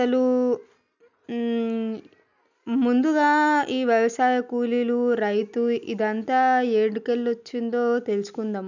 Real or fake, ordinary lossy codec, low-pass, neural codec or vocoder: real; none; 7.2 kHz; none